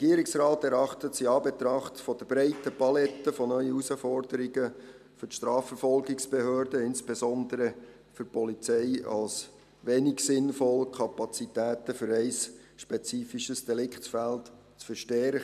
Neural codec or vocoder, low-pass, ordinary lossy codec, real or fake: none; 14.4 kHz; MP3, 96 kbps; real